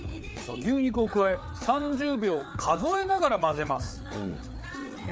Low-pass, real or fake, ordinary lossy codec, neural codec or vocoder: none; fake; none; codec, 16 kHz, 4 kbps, FreqCodec, larger model